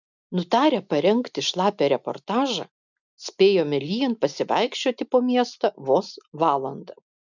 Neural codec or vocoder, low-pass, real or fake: none; 7.2 kHz; real